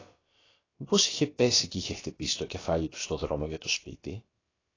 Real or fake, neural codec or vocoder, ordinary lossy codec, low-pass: fake; codec, 16 kHz, about 1 kbps, DyCAST, with the encoder's durations; AAC, 32 kbps; 7.2 kHz